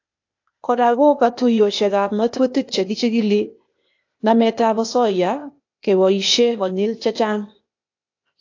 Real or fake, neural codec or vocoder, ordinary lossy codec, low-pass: fake; codec, 16 kHz, 0.8 kbps, ZipCodec; AAC, 48 kbps; 7.2 kHz